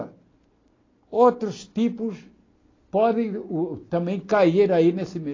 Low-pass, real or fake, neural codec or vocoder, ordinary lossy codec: 7.2 kHz; real; none; AAC, 32 kbps